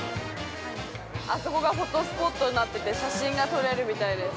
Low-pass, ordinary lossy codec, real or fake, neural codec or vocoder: none; none; real; none